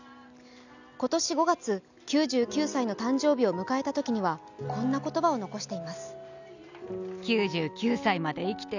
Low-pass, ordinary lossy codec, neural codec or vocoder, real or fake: 7.2 kHz; none; none; real